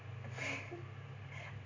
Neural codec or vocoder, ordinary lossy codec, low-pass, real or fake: none; MP3, 48 kbps; 7.2 kHz; real